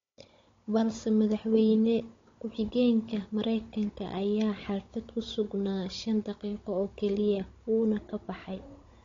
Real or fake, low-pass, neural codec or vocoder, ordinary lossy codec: fake; 7.2 kHz; codec, 16 kHz, 16 kbps, FunCodec, trained on Chinese and English, 50 frames a second; AAC, 32 kbps